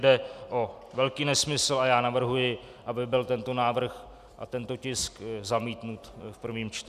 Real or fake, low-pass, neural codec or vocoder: real; 14.4 kHz; none